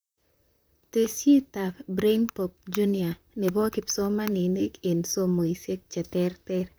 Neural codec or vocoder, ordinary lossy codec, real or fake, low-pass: vocoder, 44.1 kHz, 128 mel bands, Pupu-Vocoder; none; fake; none